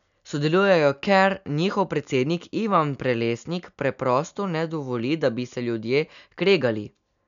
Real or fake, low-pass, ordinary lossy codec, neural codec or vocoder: real; 7.2 kHz; none; none